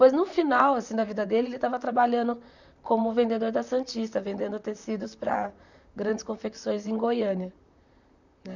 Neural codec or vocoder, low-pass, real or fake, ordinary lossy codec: vocoder, 44.1 kHz, 128 mel bands, Pupu-Vocoder; 7.2 kHz; fake; none